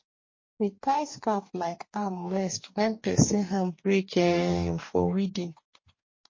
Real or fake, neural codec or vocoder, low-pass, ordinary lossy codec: fake; codec, 44.1 kHz, 2.6 kbps, DAC; 7.2 kHz; MP3, 32 kbps